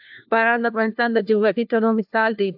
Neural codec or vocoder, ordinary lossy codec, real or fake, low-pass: codec, 16 kHz, 1 kbps, FunCodec, trained on LibriTTS, 50 frames a second; AAC, 48 kbps; fake; 5.4 kHz